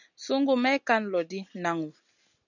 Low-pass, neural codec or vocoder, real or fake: 7.2 kHz; none; real